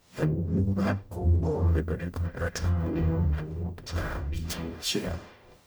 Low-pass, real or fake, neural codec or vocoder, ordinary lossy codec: none; fake; codec, 44.1 kHz, 0.9 kbps, DAC; none